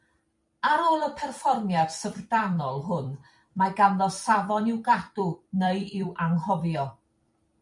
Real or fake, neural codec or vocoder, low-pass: fake; vocoder, 44.1 kHz, 128 mel bands every 256 samples, BigVGAN v2; 10.8 kHz